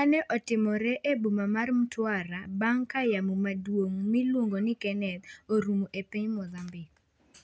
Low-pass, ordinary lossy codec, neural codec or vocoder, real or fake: none; none; none; real